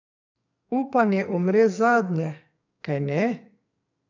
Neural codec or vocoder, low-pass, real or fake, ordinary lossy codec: codec, 44.1 kHz, 2.6 kbps, SNAC; 7.2 kHz; fake; none